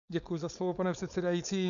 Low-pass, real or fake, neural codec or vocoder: 7.2 kHz; fake; codec, 16 kHz, 4.8 kbps, FACodec